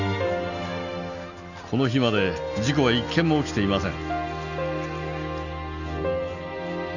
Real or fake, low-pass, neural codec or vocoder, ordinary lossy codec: real; 7.2 kHz; none; none